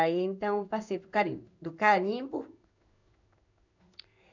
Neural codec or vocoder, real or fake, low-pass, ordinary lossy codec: codec, 16 kHz in and 24 kHz out, 1 kbps, XY-Tokenizer; fake; 7.2 kHz; none